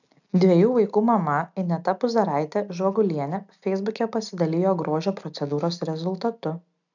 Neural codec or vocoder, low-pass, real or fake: none; 7.2 kHz; real